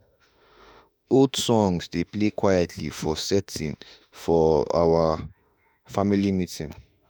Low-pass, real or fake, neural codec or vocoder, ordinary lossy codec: none; fake; autoencoder, 48 kHz, 32 numbers a frame, DAC-VAE, trained on Japanese speech; none